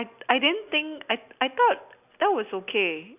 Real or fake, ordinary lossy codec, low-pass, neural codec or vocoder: real; none; 3.6 kHz; none